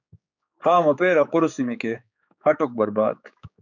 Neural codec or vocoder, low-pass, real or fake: codec, 16 kHz, 4 kbps, X-Codec, HuBERT features, trained on general audio; 7.2 kHz; fake